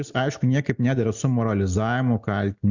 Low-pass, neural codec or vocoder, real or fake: 7.2 kHz; none; real